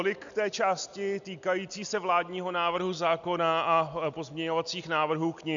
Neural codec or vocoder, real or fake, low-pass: none; real; 7.2 kHz